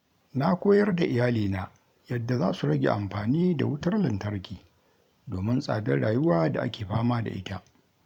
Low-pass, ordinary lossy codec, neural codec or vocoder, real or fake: 19.8 kHz; none; vocoder, 44.1 kHz, 128 mel bands every 512 samples, BigVGAN v2; fake